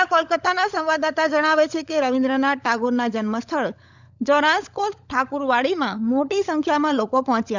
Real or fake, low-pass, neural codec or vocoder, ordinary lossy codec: fake; 7.2 kHz; codec, 16 kHz, 16 kbps, FunCodec, trained on LibriTTS, 50 frames a second; none